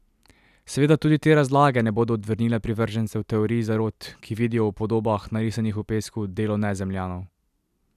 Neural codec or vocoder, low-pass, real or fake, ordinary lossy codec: none; 14.4 kHz; real; none